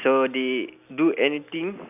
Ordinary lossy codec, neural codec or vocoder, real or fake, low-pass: none; none; real; 3.6 kHz